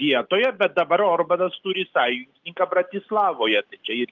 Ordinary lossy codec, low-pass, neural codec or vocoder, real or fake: Opus, 32 kbps; 7.2 kHz; none; real